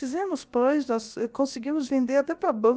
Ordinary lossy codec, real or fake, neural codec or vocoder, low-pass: none; fake; codec, 16 kHz, about 1 kbps, DyCAST, with the encoder's durations; none